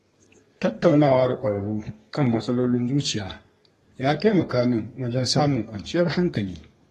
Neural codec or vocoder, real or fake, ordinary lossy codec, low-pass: codec, 32 kHz, 1.9 kbps, SNAC; fake; AAC, 32 kbps; 14.4 kHz